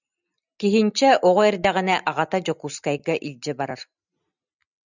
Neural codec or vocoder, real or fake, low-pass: none; real; 7.2 kHz